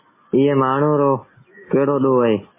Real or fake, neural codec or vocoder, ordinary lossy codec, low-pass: real; none; MP3, 16 kbps; 3.6 kHz